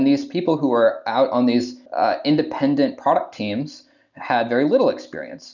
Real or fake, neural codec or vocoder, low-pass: real; none; 7.2 kHz